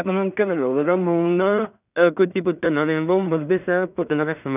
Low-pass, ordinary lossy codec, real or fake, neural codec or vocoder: 3.6 kHz; none; fake; codec, 16 kHz in and 24 kHz out, 0.4 kbps, LongCat-Audio-Codec, two codebook decoder